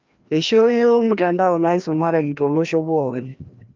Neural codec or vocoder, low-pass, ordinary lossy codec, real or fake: codec, 16 kHz, 1 kbps, FreqCodec, larger model; 7.2 kHz; Opus, 24 kbps; fake